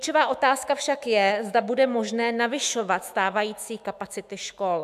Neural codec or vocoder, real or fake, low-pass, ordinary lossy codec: autoencoder, 48 kHz, 128 numbers a frame, DAC-VAE, trained on Japanese speech; fake; 14.4 kHz; AAC, 96 kbps